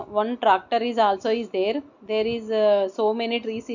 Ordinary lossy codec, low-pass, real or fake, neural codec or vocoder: none; 7.2 kHz; real; none